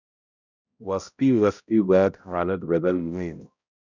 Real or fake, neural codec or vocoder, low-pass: fake; codec, 16 kHz, 0.5 kbps, X-Codec, HuBERT features, trained on balanced general audio; 7.2 kHz